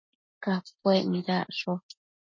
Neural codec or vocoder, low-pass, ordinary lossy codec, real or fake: none; 7.2 kHz; MP3, 32 kbps; real